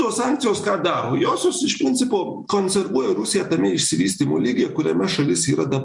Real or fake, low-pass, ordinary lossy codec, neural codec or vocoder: real; 10.8 kHz; MP3, 64 kbps; none